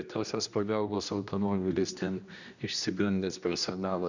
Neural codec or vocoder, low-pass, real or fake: codec, 16 kHz, 1 kbps, X-Codec, HuBERT features, trained on general audio; 7.2 kHz; fake